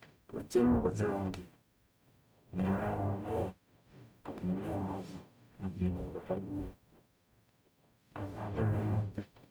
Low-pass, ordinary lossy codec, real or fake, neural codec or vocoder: none; none; fake; codec, 44.1 kHz, 0.9 kbps, DAC